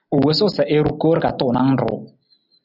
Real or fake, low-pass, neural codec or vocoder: real; 5.4 kHz; none